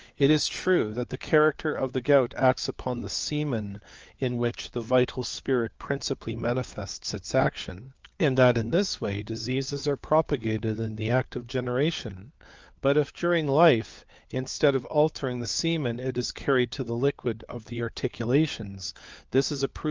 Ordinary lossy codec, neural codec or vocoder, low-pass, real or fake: Opus, 24 kbps; codec, 16 kHz, 4 kbps, FunCodec, trained on LibriTTS, 50 frames a second; 7.2 kHz; fake